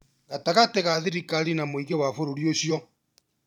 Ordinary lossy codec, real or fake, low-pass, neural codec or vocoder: none; fake; 19.8 kHz; vocoder, 44.1 kHz, 128 mel bands every 512 samples, BigVGAN v2